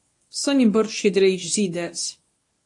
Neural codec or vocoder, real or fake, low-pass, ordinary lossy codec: codec, 24 kHz, 0.9 kbps, WavTokenizer, medium speech release version 1; fake; 10.8 kHz; AAC, 48 kbps